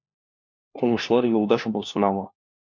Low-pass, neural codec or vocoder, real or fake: 7.2 kHz; codec, 16 kHz, 1 kbps, FunCodec, trained on LibriTTS, 50 frames a second; fake